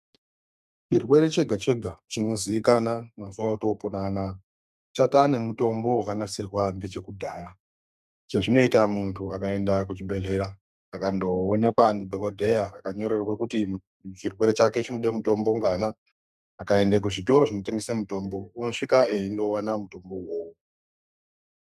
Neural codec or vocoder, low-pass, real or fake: codec, 32 kHz, 1.9 kbps, SNAC; 14.4 kHz; fake